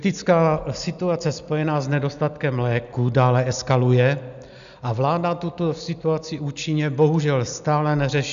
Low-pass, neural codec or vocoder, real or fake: 7.2 kHz; none; real